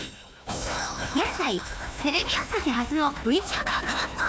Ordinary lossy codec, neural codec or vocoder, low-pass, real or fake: none; codec, 16 kHz, 1 kbps, FunCodec, trained on Chinese and English, 50 frames a second; none; fake